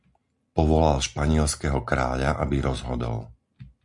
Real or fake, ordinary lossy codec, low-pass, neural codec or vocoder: real; MP3, 64 kbps; 10.8 kHz; none